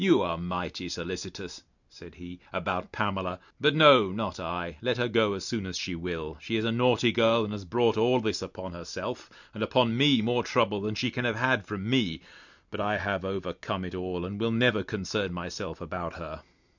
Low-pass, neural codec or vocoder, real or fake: 7.2 kHz; none; real